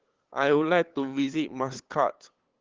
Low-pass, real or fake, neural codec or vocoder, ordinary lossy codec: 7.2 kHz; fake; codec, 16 kHz, 8 kbps, FunCodec, trained on LibriTTS, 25 frames a second; Opus, 16 kbps